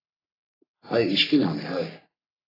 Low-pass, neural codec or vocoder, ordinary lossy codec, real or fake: 5.4 kHz; codec, 44.1 kHz, 3.4 kbps, Pupu-Codec; AAC, 24 kbps; fake